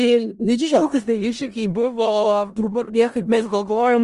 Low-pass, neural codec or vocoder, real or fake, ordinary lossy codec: 10.8 kHz; codec, 16 kHz in and 24 kHz out, 0.4 kbps, LongCat-Audio-Codec, four codebook decoder; fake; Opus, 24 kbps